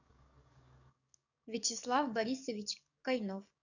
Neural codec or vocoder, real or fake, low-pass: codec, 44.1 kHz, 7.8 kbps, DAC; fake; 7.2 kHz